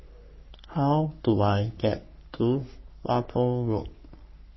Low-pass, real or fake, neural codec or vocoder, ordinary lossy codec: 7.2 kHz; fake; codec, 44.1 kHz, 3.4 kbps, Pupu-Codec; MP3, 24 kbps